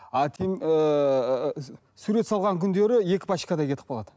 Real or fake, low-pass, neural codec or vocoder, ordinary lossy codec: real; none; none; none